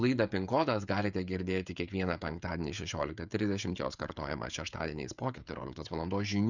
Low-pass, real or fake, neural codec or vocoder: 7.2 kHz; fake; codec, 16 kHz, 16 kbps, FreqCodec, smaller model